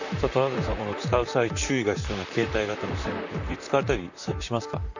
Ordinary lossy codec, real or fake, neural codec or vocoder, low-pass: none; fake; vocoder, 44.1 kHz, 128 mel bands, Pupu-Vocoder; 7.2 kHz